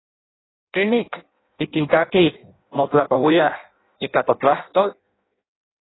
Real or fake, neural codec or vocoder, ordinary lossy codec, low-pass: fake; codec, 16 kHz in and 24 kHz out, 0.6 kbps, FireRedTTS-2 codec; AAC, 16 kbps; 7.2 kHz